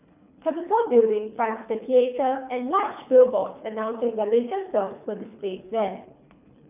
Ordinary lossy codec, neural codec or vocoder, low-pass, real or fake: none; codec, 24 kHz, 3 kbps, HILCodec; 3.6 kHz; fake